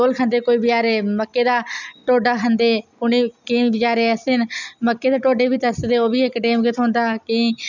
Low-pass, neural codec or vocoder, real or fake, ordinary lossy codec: 7.2 kHz; none; real; none